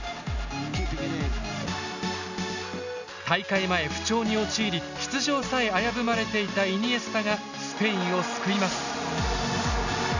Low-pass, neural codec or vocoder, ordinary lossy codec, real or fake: 7.2 kHz; none; none; real